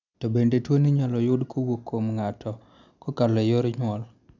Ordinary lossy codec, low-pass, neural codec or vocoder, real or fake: none; 7.2 kHz; none; real